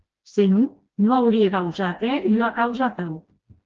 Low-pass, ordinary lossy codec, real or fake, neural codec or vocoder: 7.2 kHz; Opus, 16 kbps; fake; codec, 16 kHz, 1 kbps, FreqCodec, smaller model